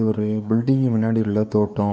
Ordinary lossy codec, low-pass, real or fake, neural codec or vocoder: none; none; fake; codec, 16 kHz, 4 kbps, X-Codec, WavLM features, trained on Multilingual LibriSpeech